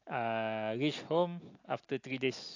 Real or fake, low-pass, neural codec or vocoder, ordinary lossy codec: real; 7.2 kHz; none; AAC, 48 kbps